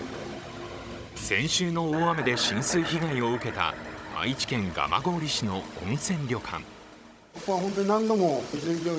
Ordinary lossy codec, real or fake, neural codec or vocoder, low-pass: none; fake; codec, 16 kHz, 16 kbps, FunCodec, trained on Chinese and English, 50 frames a second; none